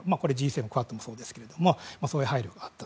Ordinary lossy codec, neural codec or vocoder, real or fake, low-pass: none; none; real; none